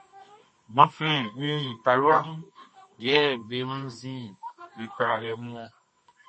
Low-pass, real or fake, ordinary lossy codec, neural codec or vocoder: 9.9 kHz; fake; MP3, 32 kbps; codec, 24 kHz, 0.9 kbps, WavTokenizer, medium music audio release